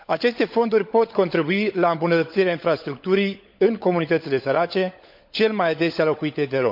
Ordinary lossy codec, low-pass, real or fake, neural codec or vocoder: MP3, 48 kbps; 5.4 kHz; fake; codec, 16 kHz, 16 kbps, FunCodec, trained on LibriTTS, 50 frames a second